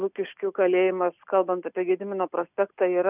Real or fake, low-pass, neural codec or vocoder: real; 3.6 kHz; none